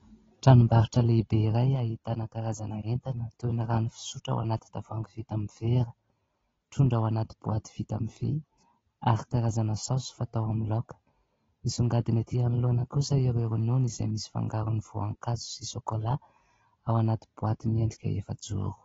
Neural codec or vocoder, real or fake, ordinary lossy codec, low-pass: none; real; AAC, 24 kbps; 7.2 kHz